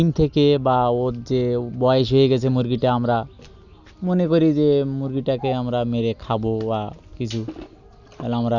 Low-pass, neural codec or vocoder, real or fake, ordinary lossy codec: 7.2 kHz; none; real; none